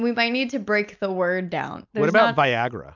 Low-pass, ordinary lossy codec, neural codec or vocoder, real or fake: 7.2 kHz; MP3, 64 kbps; none; real